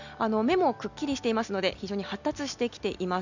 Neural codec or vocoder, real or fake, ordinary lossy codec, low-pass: none; real; none; 7.2 kHz